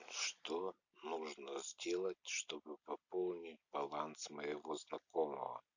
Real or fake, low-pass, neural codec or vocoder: real; 7.2 kHz; none